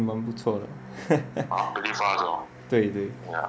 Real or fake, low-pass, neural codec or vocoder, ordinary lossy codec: real; none; none; none